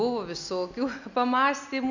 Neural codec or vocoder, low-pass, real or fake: none; 7.2 kHz; real